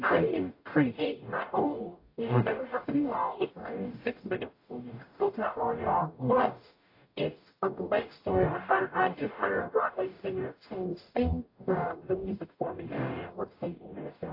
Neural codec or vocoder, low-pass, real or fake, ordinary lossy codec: codec, 44.1 kHz, 0.9 kbps, DAC; 5.4 kHz; fake; AAC, 32 kbps